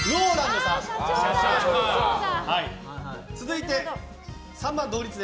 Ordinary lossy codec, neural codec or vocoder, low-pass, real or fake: none; none; none; real